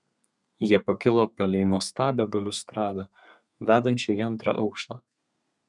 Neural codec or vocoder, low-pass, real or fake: codec, 32 kHz, 1.9 kbps, SNAC; 10.8 kHz; fake